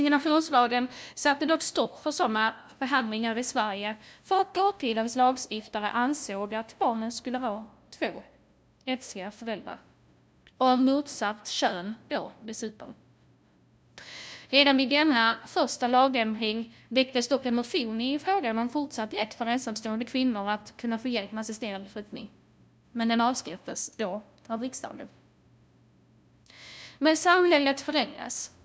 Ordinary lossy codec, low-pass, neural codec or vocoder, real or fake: none; none; codec, 16 kHz, 0.5 kbps, FunCodec, trained on LibriTTS, 25 frames a second; fake